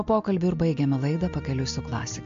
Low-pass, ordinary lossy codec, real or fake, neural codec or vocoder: 7.2 kHz; AAC, 64 kbps; real; none